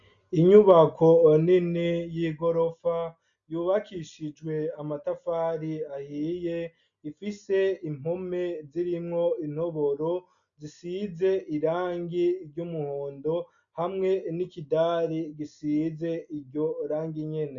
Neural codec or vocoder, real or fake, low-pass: none; real; 7.2 kHz